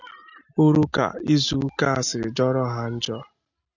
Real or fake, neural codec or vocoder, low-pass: real; none; 7.2 kHz